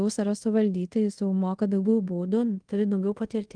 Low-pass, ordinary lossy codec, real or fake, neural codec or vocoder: 9.9 kHz; Opus, 24 kbps; fake; codec, 24 kHz, 0.5 kbps, DualCodec